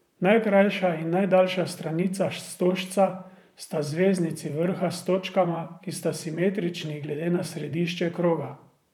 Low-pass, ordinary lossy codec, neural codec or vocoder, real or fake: 19.8 kHz; none; vocoder, 44.1 kHz, 128 mel bands, Pupu-Vocoder; fake